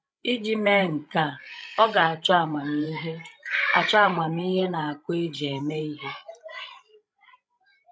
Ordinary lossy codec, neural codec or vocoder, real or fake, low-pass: none; codec, 16 kHz, 16 kbps, FreqCodec, larger model; fake; none